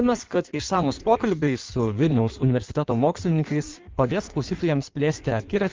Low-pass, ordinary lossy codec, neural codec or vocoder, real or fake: 7.2 kHz; Opus, 24 kbps; codec, 16 kHz in and 24 kHz out, 1.1 kbps, FireRedTTS-2 codec; fake